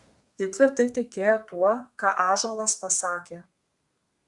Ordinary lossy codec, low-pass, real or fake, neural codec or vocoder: Opus, 64 kbps; 10.8 kHz; fake; codec, 32 kHz, 1.9 kbps, SNAC